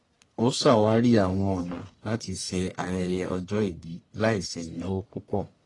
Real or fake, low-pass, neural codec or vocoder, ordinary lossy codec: fake; 10.8 kHz; codec, 44.1 kHz, 1.7 kbps, Pupu-Codec; AAC, 32 kbps